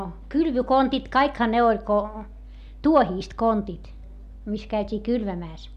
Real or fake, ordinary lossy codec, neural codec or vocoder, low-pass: real; none; none; 14.4 kHz